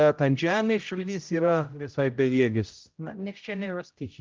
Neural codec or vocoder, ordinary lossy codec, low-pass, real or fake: codec, 16 kHz, 0.5 kbps, X-Codec, HuBERT features, trained on general audio; Opus, 32 kbps; 7.2 kHz; fake